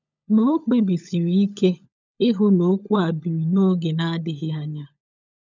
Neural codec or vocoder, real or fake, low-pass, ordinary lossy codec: codec, 16 kHz, 16 kbps, FunCodec, trained on LibriTTS, 50 frames a second; fake; 7.2 kHz; none